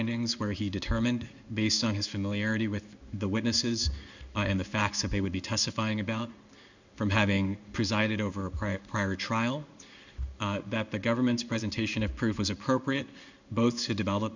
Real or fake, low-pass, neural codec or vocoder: fake; 7.2 kHz; codec, 16 kHz in and 24 kHz out, 1 kbps, XY-Tokenizer